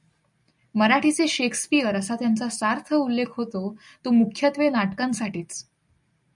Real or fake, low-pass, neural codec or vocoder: real; 10.8 kHz; none